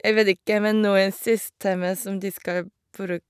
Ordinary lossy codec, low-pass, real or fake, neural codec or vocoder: none; 14.4 kHz; real; none